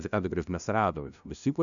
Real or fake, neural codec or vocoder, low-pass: fake; codec, 16 kHz, 0.5 kbps, FunCodec, trained on LibriTTS, 25 frames a second; 7.2 kHz